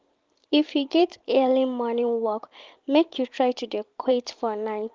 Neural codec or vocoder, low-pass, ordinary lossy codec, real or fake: vocoder, 44.1 kHz, 128 mel bands every 512 samples, BigVGAN v2; 7.2 kHz; Opus, 32 kbps; fake